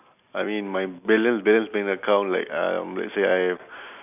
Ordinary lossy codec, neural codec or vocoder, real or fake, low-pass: none; none; real; 3.6 kHz